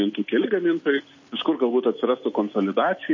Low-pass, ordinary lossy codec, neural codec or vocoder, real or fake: 7.2 kHz; MP3, 32 kbps; none; real